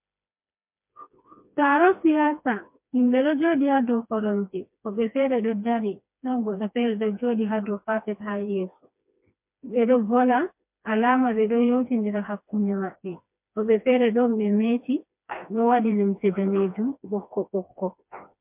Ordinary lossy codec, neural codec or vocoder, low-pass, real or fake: MP3, 32 kbps; codec, 16 kHz, 2 kbps, FreqCodec, smaller model; 3.6 kHz; fake